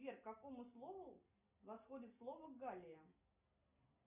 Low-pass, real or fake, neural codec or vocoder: 3.6 kHz; real; none